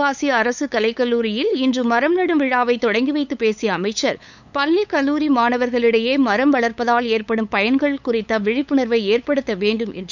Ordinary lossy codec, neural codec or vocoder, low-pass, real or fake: none; codec, 16 kHz, 8 kbps, FunCodec, trained on LibriTTS, 25 frames a second; 7.2 kHz; fake